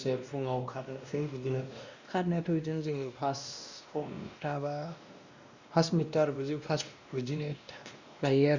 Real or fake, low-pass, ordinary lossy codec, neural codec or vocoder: fake; 7.2 kHz; Opus, 64 kbps; codec, 16 kHz, 1 kbps, X-Codec, WavLM features, trained on Multilingual LibriSpeech